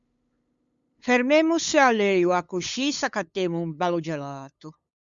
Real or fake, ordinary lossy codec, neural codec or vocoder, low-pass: fake; Opus, 64 kbps; codec, 16 kHz, 8 kbps, FunCodec, trained on LibriTTS, 25 frames a second; 7.2 kHz